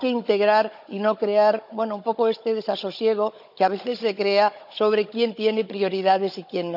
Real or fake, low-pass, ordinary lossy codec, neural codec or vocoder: fake; 5.4 kHz; none; codec, 16 kHz, 16 kbps, FunCodec, trained on Chinese and English, 50 frames a second